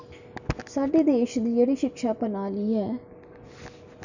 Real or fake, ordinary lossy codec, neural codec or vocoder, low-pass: real; none; none; 7.2 kHz